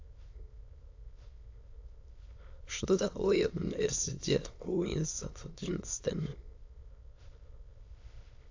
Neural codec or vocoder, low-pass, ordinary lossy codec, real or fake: autoencoder, 22.05 kHz, a latent of 192 numbers a frame, VITS, trained on many speakers; 7.2 kHz; AAC, 48 kbps; fake